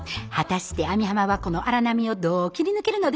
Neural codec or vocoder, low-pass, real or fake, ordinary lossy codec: none; none; real; none